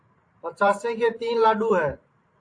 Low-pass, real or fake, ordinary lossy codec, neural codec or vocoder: 9.9 kHz; fake; AAC, 48 kbps; vocoder, 44.1 kHz, 128 mel bands every 512 samples, BigVGAN v2